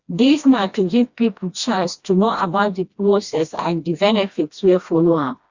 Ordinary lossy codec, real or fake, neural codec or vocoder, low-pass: Opus, 64 kbps; fake; codec, 16 kHz, 1 kbps, FreqCodec, smaller model; 7.2 kHz